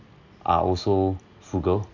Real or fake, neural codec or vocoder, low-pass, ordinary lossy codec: real; none; 7.2 kHz; none